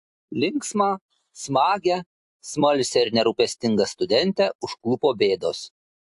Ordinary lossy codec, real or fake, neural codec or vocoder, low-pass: AAC, 64 kbps; real; none; 10.8 kHz